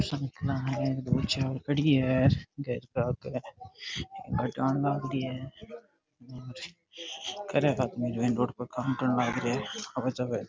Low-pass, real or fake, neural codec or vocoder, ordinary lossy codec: none; real; none; none